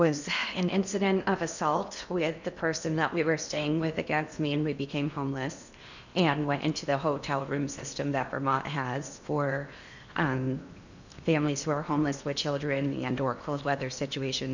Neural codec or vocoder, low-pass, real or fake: codec, 16 kHz in and 24 kHz out, 0.8 kbps, FocalCodec, streaming, 65536 codes; 7.2 kHz; fake